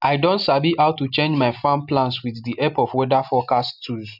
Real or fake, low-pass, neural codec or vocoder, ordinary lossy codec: real; 5.4 kHz; none; none